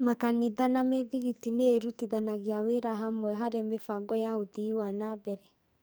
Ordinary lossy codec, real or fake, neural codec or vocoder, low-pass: none; fake; codec, 44.1 kHz, 2.6 kbps, SNAC; none